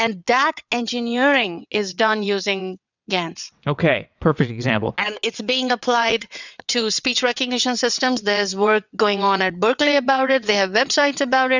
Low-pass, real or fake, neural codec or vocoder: 7.2 kHz; fake; vocoder, 22.05 kHz, 80 mel bands, WaveNeXt